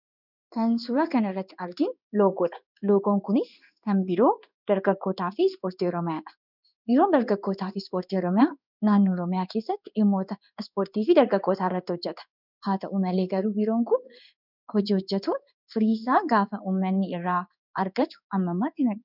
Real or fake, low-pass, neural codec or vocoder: fake; 5.4 kHz; codec, 16 kHz in and 24 kHz out, 1 kbps, XY-Tokenizer